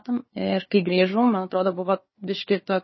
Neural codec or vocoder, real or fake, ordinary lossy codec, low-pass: codec, 16 kHz, 4 kbps, FunCodec, trained on Chinese and English, 50 frames a second; fake; MP3, 24 kbps; 7.2 kHz